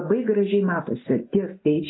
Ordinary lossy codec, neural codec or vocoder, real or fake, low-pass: AAC, 16 kbps; none; real; 7.2 kHz